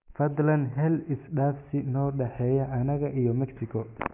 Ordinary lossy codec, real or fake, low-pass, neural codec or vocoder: none; real; 3.6 kHz; none